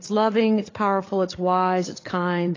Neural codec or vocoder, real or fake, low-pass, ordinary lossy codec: codec, 16 kHz, 8 kbps, FreqCodec, larger model; fake; 7.2 kHz; AAC, 32 kbps